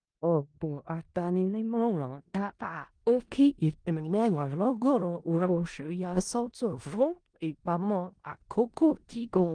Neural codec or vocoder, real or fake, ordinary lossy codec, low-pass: codec, 16 kHz in and 24 kHz out, 0.4 kbps, LongCat-Audio-Codec, four codebook decoder; fake; Opus, 24 kbps; 9.9 kHz